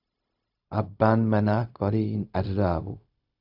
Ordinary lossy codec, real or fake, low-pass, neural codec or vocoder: AAC, 48 kbps; fake; 5.4 kHz; codec, 16 kHz, 0.4 kbps, LongCat-Audio-Codec